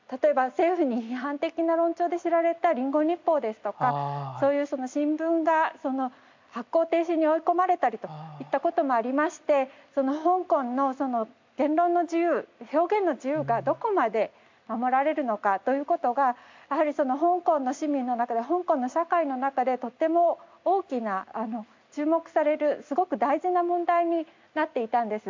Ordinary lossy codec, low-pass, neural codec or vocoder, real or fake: MP3, 64 kbps; 7.2 kHz; none; real